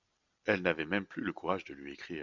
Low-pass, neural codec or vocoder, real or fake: 7.2 kHz; none; real